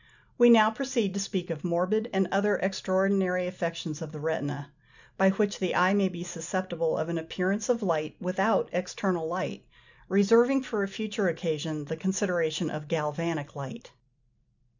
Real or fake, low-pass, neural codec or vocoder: real; 7.2 kHz; none